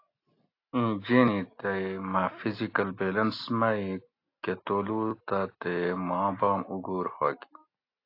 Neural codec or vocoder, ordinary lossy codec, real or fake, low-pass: none; AAC, 32 kbps; real; 5.4 kHz